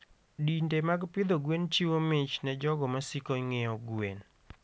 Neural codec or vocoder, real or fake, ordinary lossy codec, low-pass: none; real; none; none